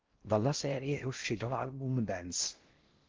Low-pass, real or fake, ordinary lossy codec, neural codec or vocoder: 7.2 kHz; fake; Opus, 16 kbps; codec, 16 kHz in and 24 kHz out, 0.6 kbps, FocalCodec, streaming, 2048 codes